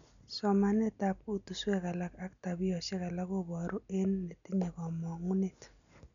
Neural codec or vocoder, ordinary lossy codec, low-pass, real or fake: none; none; 7.2 kHz; real